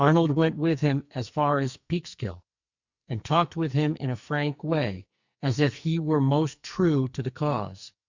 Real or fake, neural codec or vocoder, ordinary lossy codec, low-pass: fake; codec, 44.1 kHz, 2.6 kbps, SNAC; Opus, 64 kbps; 7.2 kHz